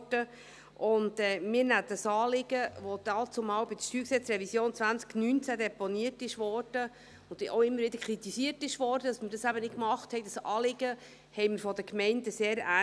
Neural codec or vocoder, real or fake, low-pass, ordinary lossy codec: none; real; none; none